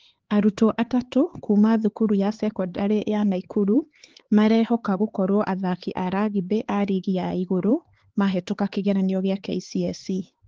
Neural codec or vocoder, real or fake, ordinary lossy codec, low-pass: codec, 16 kHz, 4 kbps, X-Codec, HuBERT features, trained on LibriSpeech; fake; Opus, 16 kbps; 7.2 kHz